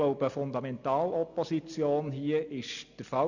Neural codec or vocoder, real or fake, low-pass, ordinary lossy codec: none; real; 7.2 kHz; none